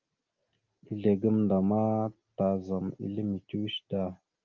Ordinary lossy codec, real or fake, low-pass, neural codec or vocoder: Opus, 24 kbps; real; 7.2 kHz; none